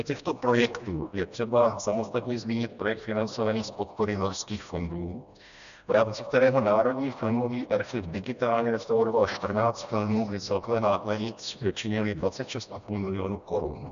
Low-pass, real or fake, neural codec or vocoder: 7.2 kHz; fake; codec, 16 kHz, 1 kbps, FreqCodec, smaller model